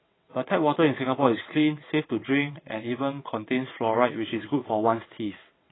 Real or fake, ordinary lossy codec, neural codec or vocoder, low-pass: fake; AAC, 16 kbps; vocoder, 22.05 kHz, 80 mel bands, WaveNeXt; 7.2 kHz